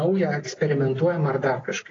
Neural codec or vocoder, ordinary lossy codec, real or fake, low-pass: none; AAC, 32 kbps; real; 7.2 kHz